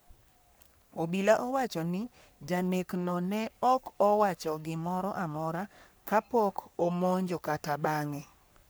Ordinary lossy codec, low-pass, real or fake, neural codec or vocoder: none; none; fake; codec, 44.1 kHz, 3.4 kbps, Pupu-Codec